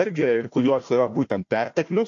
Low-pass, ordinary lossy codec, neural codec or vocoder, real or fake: 7.2 kHz; AAC, 48 kbps; codec, 16 kHz, 1 kbps, FunCodec, trained on Chinese and English, 50 frames a second; fake